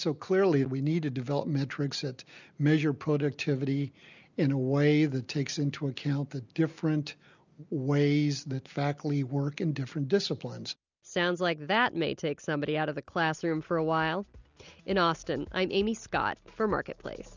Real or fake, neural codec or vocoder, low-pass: real; none; 7.2 kHz